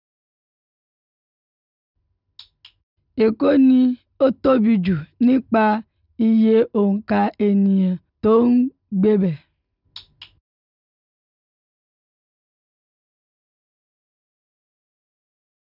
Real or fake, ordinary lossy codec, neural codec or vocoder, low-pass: real; none; none; 5.4 kHz